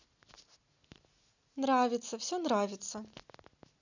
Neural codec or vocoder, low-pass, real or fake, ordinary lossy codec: none; 7.2 kHz; real; none